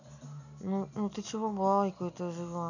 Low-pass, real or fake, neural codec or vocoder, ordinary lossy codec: 7.2 kHz; real; none; none